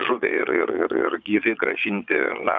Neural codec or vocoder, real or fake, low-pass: vocoder, 22.05 kHz, 80 mel bands, Vocos; fake; 7.2 kHz